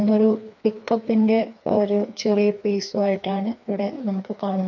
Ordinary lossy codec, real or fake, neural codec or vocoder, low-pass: none; fake; codec, 16 kHz, 2 kbps, FreqCodec, larger model; 7.2 kHz